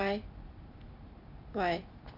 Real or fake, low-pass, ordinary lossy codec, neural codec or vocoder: fake; 5.4 kHz; none; vocoder, 44.1 kHz, 128 mel bands every 256 samples, BigVGAN v2